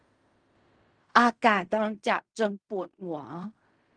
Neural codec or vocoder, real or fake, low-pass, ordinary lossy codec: codec, 16 kHz in and 24 kHz out, 0.4 kbps, LongCat-Audio-Codec, fine tuned four codebook decoder; fake; 9.9 kHz; Opus, 32 kbps